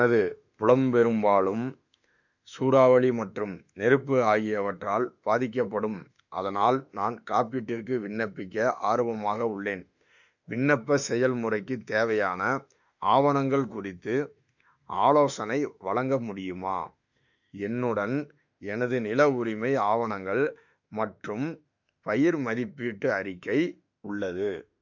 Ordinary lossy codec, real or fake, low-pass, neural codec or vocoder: none; fake; 7.2 kHz; autoencoder, 48 kHz, 32 numbers a frame, DAC-VAE, trained on Japanese speech